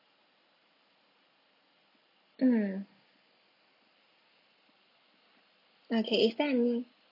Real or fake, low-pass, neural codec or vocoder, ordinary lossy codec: real; 5.4 kHz; none; none